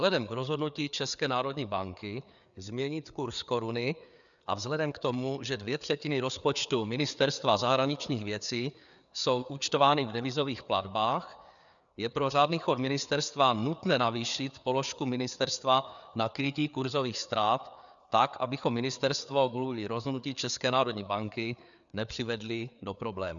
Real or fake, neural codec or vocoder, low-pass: fake; codec, 16 kHz, 4 kbps, FreqCodec, larger model; 7.2 kHz